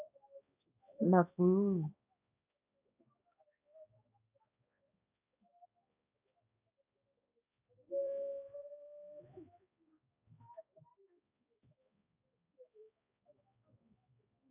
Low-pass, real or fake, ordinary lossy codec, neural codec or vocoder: 3.6 kHz; fake; AAC, 32 kbps; codec, 16 kHz, 1 kbps, X-Codec, HuBERT features, trained on balanced general audio